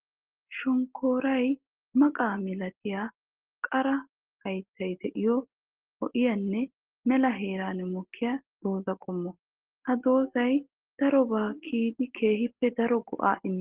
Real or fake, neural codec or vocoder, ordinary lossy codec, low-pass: real; none; Opus, 16 kbps; 3.6 kHz